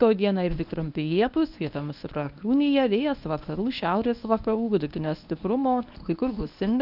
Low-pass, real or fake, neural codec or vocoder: 5.4 kHz; fake; codec, 24 kHz, 0.9 kbps, WavTokenizer, medium speech release version 1